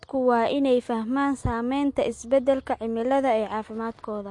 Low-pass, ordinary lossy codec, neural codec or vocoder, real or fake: 10.8 kHz; MP3, 48 kbps; none; real